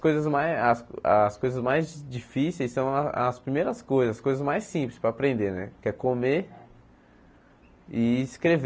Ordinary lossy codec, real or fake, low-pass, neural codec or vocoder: none; real; none; none